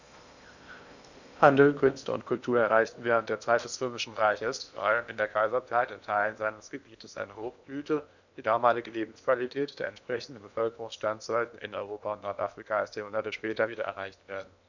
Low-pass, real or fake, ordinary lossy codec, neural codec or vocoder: 7.2 kHz; fake; none; codec, 16 kHz in and 24 kHz out, 0.8 kbps, FocalCodec, streaming, 65536 codes